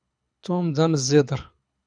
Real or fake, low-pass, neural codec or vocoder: fake; 9.9 kHz; codec, 24 kHz, 6 kbps, HILCodec